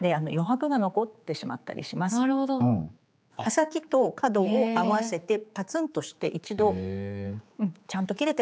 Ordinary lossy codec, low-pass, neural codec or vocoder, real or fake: none; none; codec, 16 kHz, 4 kbps, X-Codec, HuBERT features, trained on general audio; fake